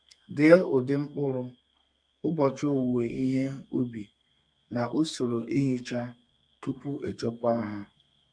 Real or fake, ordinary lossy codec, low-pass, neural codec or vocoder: fake; none; 9.9 kHz; codec, 32 kHz, 1.9 kbps, SNAC